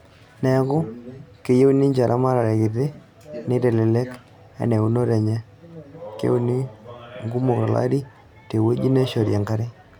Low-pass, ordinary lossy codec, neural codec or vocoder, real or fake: 19.8 kHz; none; none; real